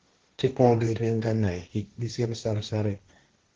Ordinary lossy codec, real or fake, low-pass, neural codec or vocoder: Opus, 16 kbps; fake; 7.2 kHz; codec, 16 kHz, 1.1 kbps, Voila-Tokenizer